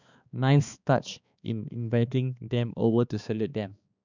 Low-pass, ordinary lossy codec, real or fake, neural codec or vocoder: 7.2 kHz; none; fake; codec, 16 kHz, 2 kbps, X-Codec, HuBERT features, trained on balanced general audio